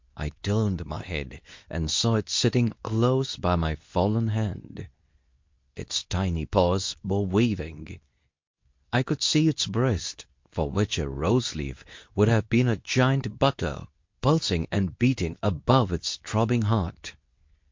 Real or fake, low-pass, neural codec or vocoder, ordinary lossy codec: fake; 7.2 kHz; codec, 24 kHz, 0.9 kbps, WavTokenizer, medium speech release version 2; MP3, 48 kbps